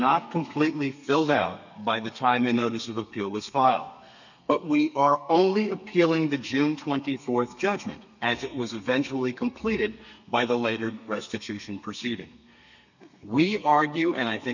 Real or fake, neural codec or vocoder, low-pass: fake; codec, 32 kHz, 1.9 kbps, SNAC; 7.2 kHz